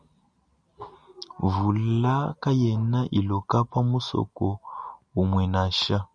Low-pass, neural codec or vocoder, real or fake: 9.9 kHz; none; real